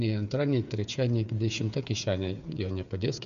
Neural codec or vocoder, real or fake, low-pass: codec, 16 kHz, 8 kbps, FreqCodec, smaller model; fake; 7.2 kHz